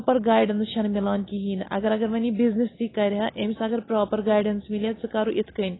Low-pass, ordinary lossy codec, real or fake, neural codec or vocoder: 7.2 kHz; AAC, 16 kbps; real; none